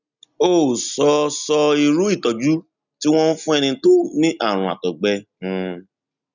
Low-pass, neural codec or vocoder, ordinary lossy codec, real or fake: 7.2 kHz; none; none; real